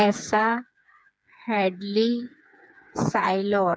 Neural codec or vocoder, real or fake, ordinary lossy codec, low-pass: codec, 16 kHz, 4 kbps, FreqCodec, smaller model; fake; none; none